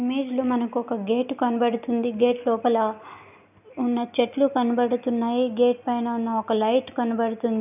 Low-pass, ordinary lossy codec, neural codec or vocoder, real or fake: 3.6 kHz; none; none; real